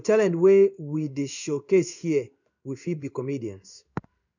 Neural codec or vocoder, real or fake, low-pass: codec, 16 kHz in and 24 kHz out, 1 kbps, XY-Tokenizer; fake; 7.2 kHz